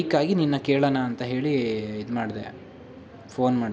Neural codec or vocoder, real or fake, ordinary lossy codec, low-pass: none; real; none; none